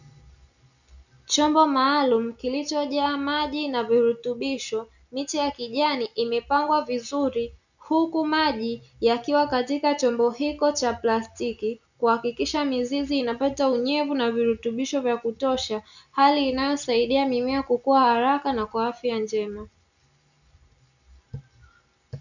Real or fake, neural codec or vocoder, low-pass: real; none; 7.2 kHz